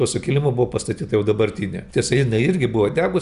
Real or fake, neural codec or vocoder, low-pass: real; none; 10.8 kHz